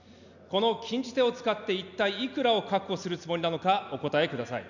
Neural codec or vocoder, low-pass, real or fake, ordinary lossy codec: none; 7.2 kHz; real; none